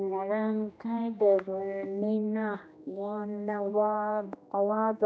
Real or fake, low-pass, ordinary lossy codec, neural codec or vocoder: fake; none; none; codec, 16 kHz, 1 kbps, X-Codec, HuBERT features, trained on general audio